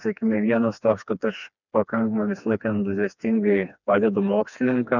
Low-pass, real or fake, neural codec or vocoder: 7.2 kHz; fake; codec, 16 kHz, 2 kbps, FreqCodec, smaller model